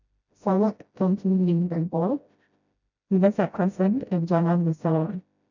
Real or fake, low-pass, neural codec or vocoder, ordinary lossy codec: fake; 7.2 kHz; codec, 16 kHz, 0.5 kbps, FreqCodec, smaller model; none